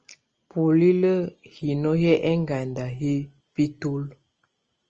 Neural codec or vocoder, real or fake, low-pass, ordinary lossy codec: none; real; 7.2 kHz; Opus, 24 kbps